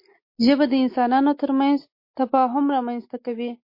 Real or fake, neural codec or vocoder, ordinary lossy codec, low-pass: real; none; MP3, 32 kbps; 5.4 kHz